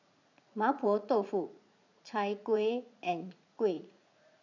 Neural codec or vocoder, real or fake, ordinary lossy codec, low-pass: vocoder, 44.1 kHz, 80 mel bands, Vocos; fake; none; 7.2 kHz